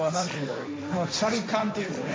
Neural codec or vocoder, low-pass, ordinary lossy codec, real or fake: codec, 16 kHz, 1.1 kbps, Voila-Tokenizer; none; none; fake